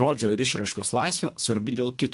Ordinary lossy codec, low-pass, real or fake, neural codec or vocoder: AAC, 64 kbps; 10.8 kHz; fake; codec, 24 kHz, 1.5 kbps, HILCodec